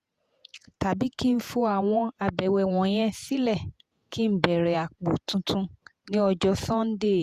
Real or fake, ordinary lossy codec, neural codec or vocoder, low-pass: fake; Opus, 64 kbps; vocoder, 48 kHz, 128 mel bands, Vocos; 14.4 kHz